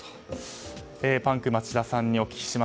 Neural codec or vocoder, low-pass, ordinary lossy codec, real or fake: none; none; none; real